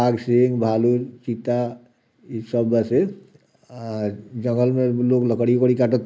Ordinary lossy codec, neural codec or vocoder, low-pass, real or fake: none; none; none; real